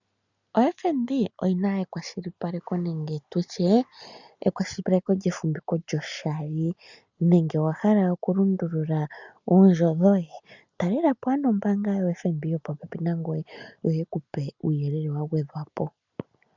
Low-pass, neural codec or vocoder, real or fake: 7.2 kHz; none; real